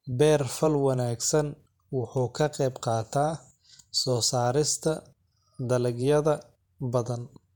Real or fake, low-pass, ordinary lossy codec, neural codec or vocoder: fake; 19.8 kHz; none; vocoder, 48 kHz, 128 mel bands, Vocos